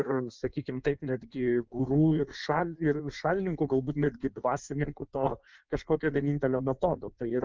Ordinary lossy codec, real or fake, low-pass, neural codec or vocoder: Opus, 32 kbps; fake; 7.2 kHz; codec, 16 kHz in and 24 kHz out, 1.1 kbps, FireRedTTS-2 codec